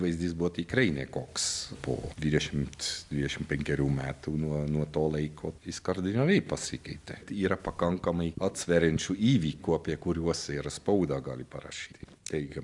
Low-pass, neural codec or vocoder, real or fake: 10.8 kHz; none; real